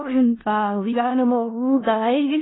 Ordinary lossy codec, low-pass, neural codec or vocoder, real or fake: AAC, 16 kbps; 7.2 kHz; codec, 16 kHz in and 24 kHz out, 0.4 kbps, LongCat-Audio-Codec, four codebook decoder; fake